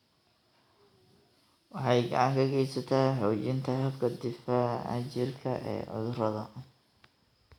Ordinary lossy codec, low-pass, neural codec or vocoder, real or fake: none; 19.8 kHz; none; real